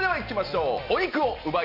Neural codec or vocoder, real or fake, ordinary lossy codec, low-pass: none; real; none; 5.4 kHz